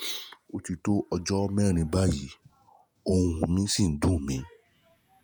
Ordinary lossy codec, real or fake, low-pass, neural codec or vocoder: none; fake; none; vocoder, 48 kHz, 128 mel bands, Vocos